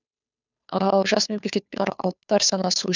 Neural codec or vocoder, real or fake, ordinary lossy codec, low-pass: codec, 16 kHz, 2 kbps, FunCodec, trained on Chinese and English, 25 frames a second; fake; none; 7.2 kHz